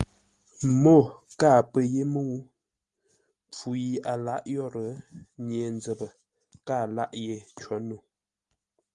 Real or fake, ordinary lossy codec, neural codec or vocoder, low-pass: real; Opus, 32 kbps; none; 10.8 kHz